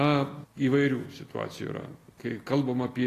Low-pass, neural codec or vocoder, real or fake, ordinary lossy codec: 14.4 kHz; none; real; AAC, 48 kbps